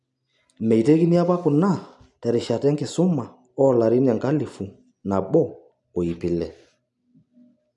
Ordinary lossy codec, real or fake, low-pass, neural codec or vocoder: none; real; 10.8 kHz; none